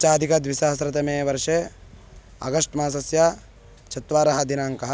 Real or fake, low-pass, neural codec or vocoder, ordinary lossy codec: real; none; none; none